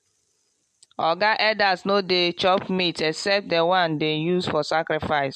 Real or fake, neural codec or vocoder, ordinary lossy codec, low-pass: real; none; MP3, 64 kbps; 14.4 kHz